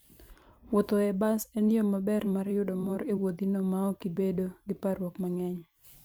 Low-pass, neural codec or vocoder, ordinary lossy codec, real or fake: none; vocoder, 44.1 kHz, 128 mel bands every 512 samples, BigVGAN v2; none; fake